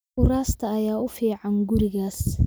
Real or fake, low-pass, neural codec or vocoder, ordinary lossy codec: real; none; none; none